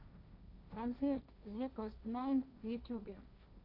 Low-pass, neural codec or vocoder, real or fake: 5.4 kHz; codec, 16 kHz, 1.1 kbps, Voila-Tokenizer; fake